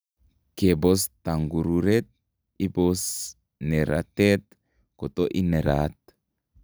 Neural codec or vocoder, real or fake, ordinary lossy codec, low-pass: none; real; none; none